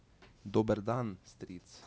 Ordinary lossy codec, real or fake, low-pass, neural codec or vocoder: none; real; none; none